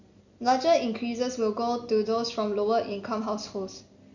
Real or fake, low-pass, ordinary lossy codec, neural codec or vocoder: real; 7.2 kHz; none; none